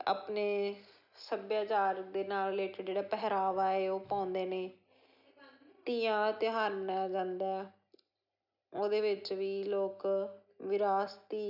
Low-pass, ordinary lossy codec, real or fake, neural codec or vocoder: 5.4 kHz; none; real; none